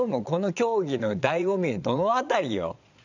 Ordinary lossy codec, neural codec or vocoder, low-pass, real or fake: none; vocoder, 22.05 kHz, 80 mel bands, Vocos; 7.2 kHz; fake